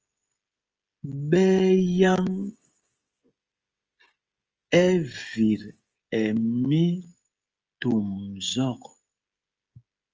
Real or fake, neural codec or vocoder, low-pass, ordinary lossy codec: fake; codec, 16 kHz, 16 kbps, FreqCodec, smaller model; 7.2 kHz; Opus, 24 kbps